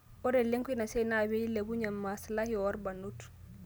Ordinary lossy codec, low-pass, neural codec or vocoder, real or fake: none; none; none; real